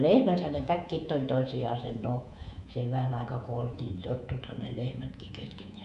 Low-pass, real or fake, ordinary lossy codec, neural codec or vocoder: 10.8 kHz; fake; none; codec, 24 kHz, 3.1 kbps, DualCodec